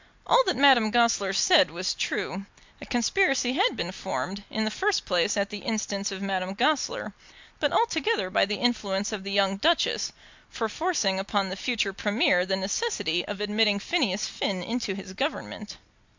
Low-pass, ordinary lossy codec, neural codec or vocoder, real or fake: 7.2 kHz; MP3, 64 kbps; none; real